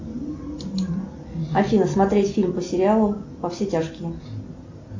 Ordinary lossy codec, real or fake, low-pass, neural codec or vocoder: AAC, 48 kbps; real; 7.2 kHz; none